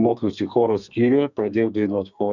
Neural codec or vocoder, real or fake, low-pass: codec, 32 kHz, 1.9 kbps, SNAC; fake; 7.2 kHz